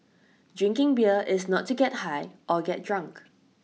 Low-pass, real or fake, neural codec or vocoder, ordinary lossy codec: none; real; none; none